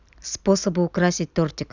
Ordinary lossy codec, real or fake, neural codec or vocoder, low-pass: none; real; none; 7.2 kHz